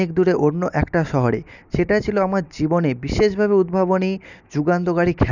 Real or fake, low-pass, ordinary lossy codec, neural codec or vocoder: real; 7.2 kHz; none; none